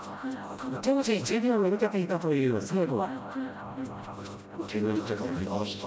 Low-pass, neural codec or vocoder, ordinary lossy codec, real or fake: none; codec, 16 kHz, 0.5 kbps, FreqCodec, smaller model; none; fake